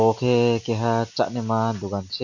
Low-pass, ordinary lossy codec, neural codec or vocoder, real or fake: 7.2 kHz; none; none; real